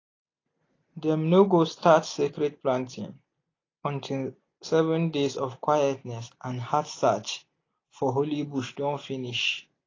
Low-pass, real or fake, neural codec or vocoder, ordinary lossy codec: 7.2 kHz; real; none; AAC, 32 kbps